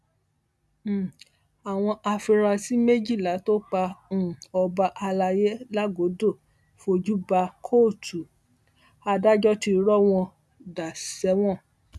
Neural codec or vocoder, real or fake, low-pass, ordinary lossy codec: none; real; none; none